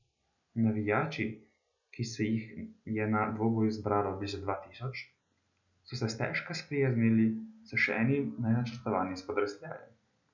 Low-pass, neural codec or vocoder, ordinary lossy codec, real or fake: 7.2 kHz; none; none; real